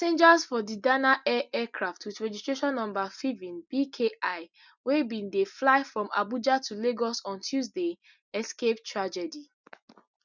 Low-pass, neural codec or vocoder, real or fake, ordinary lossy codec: 7.2 kHz; none; real; none